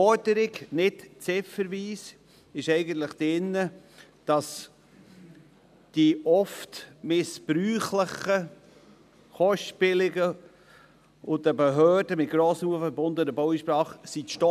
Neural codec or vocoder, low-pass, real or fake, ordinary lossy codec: none; 14.4 kHz; real; none